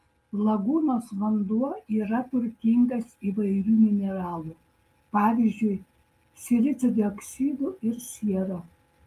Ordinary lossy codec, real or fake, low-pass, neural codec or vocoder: Opus, 32 kbps; real; 14.4 kHz; none